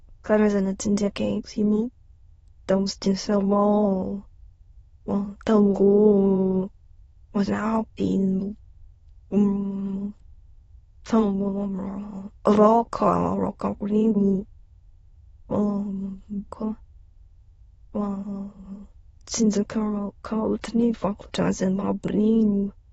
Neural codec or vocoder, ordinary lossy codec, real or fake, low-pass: autoencoder, 22.05 kHz, a latent of 192 numbers a frame, VITS, trained on many speakers; AAC, 24 kbps; fake; 9.9 kHz